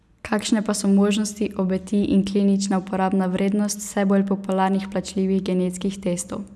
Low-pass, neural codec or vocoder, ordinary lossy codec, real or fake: none; none; none; real